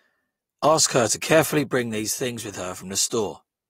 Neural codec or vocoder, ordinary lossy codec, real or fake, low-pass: none; AAC, 48 kbps; real; 19.8 kHz